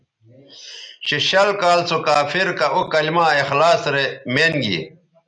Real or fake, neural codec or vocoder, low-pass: real; none; 9.9 kHz